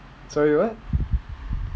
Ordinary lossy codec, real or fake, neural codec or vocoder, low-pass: none; real; none; none